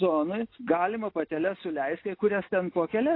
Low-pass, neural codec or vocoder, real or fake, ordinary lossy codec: 5.4 kHz; none; real; AAC, 32 kbps